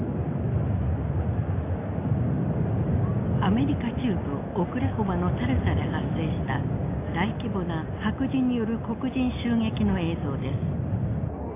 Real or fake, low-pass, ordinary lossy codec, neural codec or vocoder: real; 3.6 kHz; AAC, 24 kbps; none